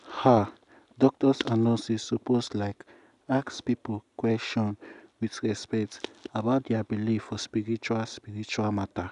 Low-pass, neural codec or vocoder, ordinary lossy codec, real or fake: 10.8 kHz; none; none; real